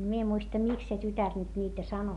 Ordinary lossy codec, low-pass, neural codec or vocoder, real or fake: none; 10.8 kHz; none; real